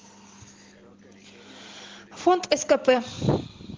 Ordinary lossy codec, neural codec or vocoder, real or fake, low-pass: Opus, 16 kbps; none; real; 7.2 kHz